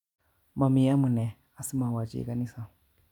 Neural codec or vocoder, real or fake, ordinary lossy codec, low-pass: none; real; none; 19.8 kHz